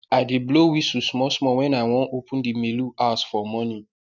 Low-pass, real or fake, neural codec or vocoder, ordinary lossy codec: 7.2 kHz; real; none; none